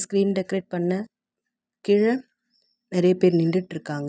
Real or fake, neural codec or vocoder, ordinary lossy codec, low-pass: real; none; none; none